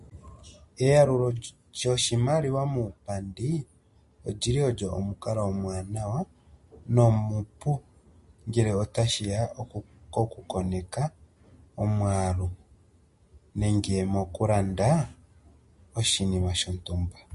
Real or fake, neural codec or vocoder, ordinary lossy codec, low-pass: real; none; MP3, 48 kbps; 14.4 kHz